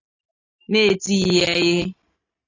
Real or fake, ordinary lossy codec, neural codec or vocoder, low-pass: real; AAC, 32 kbps; none; 7.2 kHz